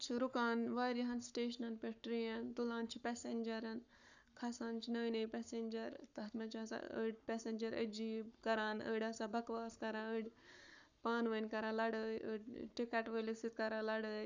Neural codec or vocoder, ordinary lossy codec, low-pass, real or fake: codec, 44.1 kHz, 7.8 kbps, Pupu-Codec; none; 7.2 kHz; fake